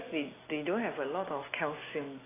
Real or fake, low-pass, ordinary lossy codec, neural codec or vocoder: real; 3.6 kHz; AAC, 16 kbps; none